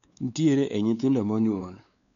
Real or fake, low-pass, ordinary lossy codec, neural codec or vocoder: fake; 7.2 kHz; none; codec, 16 kHz, 4 kbps, X-Codec, WavLM features, trained on Multilingual LibriSpeech